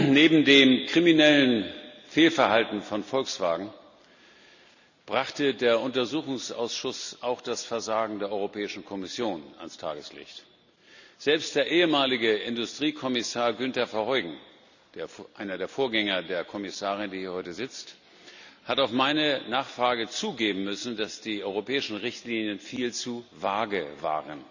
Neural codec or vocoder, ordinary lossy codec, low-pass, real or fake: none; none; 7.2 kHz; real